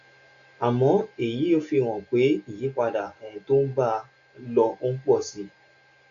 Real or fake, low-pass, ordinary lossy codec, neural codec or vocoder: real; 7.2 kHz; none; none